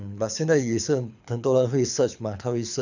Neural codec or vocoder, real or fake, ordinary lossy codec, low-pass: codec, 24 kHz, 6 kbps, HILCodec; fake; none; 7.2 kHz